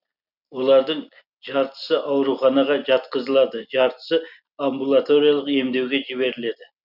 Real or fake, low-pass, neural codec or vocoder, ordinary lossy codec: real; 5.4 kHz; none; none